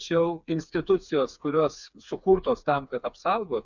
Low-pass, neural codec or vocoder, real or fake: 7.2 kHz; codec, 16 kHz, 4 kbps, FreqCodec, smaller model; fake